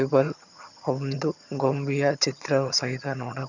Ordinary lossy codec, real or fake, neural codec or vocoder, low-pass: none; fake; vocoder, 22.05 kHz, 80 mel bands, HiFi-GAN; 7.2 kHz